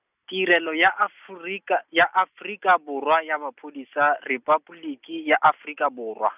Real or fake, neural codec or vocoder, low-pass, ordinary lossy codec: real; none; 3.6 kHz; none